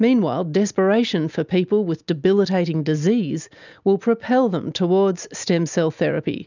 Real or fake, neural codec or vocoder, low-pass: real; none; 7.2 kHz